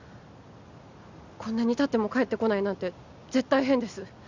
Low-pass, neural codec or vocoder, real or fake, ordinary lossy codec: 7.2 kHz; none; real; Opus, 64 kbps